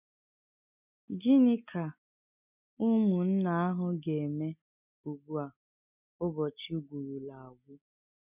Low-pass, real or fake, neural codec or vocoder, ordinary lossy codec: 3.6 kHz; real; none; none